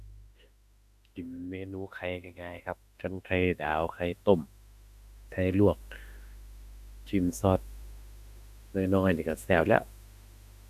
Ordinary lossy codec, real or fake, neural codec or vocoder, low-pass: none; fake; autoencoder, 48 kHz, 32 numbers a frame, DAC-VAE, trained on Japanese speech; 14.4 kHz